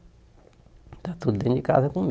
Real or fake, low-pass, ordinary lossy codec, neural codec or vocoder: real; none; none; none